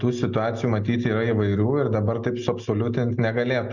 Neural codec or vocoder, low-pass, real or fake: none; 7.2 kHz; real